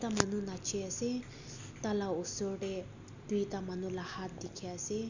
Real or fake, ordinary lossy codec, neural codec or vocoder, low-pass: real; none; none; 7.2 kHz